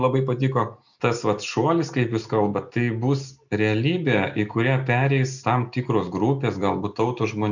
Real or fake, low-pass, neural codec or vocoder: real; 7.2 kHz; none